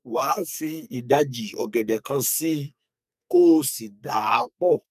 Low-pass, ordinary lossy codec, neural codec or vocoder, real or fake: 14.4 kHz; none; codec, 44.1 kHz, 2.6 kbps, SNAC; fake